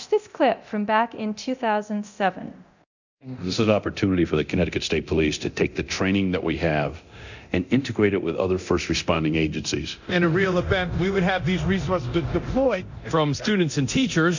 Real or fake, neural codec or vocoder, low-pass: fake; codec, 24 kHz, 0.9 kbps, DualCodec; 7.2 kHz